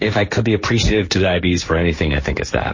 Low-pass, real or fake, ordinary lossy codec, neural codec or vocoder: 7.2 kHz; fake; MP3, 32 kbps; codec, 16 kHz, 1.1 kbps, Voila-Tokenizer